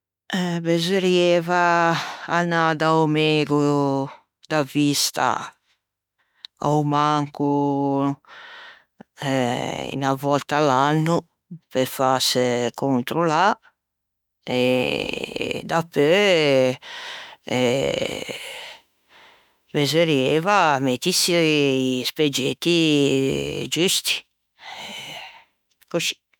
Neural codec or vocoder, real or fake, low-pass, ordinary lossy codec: autoencoder, 48 kHz, 32 numbers a frame, DAC-VAE, trained on Japanese speech; fake; 19.8 kHz; none